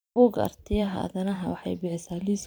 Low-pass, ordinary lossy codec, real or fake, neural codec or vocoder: none; none; fake; vocoder, 44.1 kHz, 128 mel bands every 512 samples, BigVGAN v2